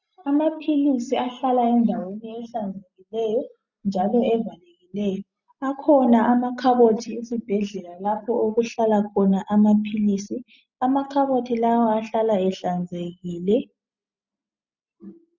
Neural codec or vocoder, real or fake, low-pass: none; real; 7.2 kHz